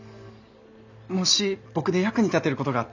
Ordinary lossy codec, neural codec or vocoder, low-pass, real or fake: none; none; 7.2 kHz; real